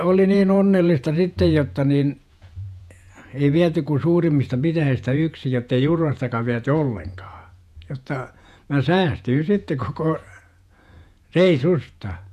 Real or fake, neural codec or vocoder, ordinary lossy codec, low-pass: fake; vocoder, 48 kHz, 128 mel bands, Vocos; none; 14.4 kHz